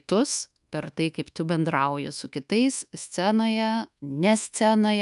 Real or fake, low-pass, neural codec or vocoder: fake; 10.8 kHz; codec, 24 kHz, 1.2 kbps, DualCodec